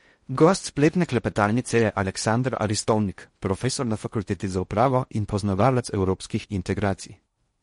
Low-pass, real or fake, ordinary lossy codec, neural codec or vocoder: 10.8 kHz; fake; MP3, 48 kbps; codec, 16 kHz in and 24 kHz out, 0.6 kbps, FocalCodec, streaming, 4096 codes